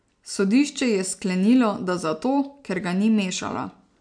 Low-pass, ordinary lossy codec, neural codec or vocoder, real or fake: 9.9 kHz; MP3, 64 kbps; none; real